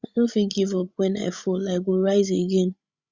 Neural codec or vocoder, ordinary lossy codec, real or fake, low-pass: codec, 16 kHz, 16 kbps, FreqCodec, larger model; Opus, 64 kbps; fake; 7.2 kHz